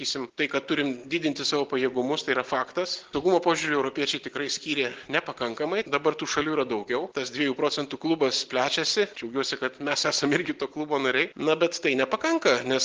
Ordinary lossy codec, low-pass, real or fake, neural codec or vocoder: Opus, 16 kbps; 7.2 kHz; real; none